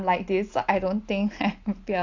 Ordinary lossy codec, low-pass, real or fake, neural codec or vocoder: AAC, 48 kbps; 7.2 kHz; real; none